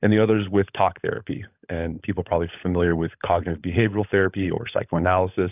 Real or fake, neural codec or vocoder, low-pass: fake; codec, 16 kHz, 16 kbps, FunCodec, trained on LibriTTS, 50 frames a second; 3.6 kHz